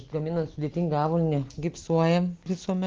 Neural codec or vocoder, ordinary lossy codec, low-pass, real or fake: none; Opus, 16 kbps; 7.2 kHz; real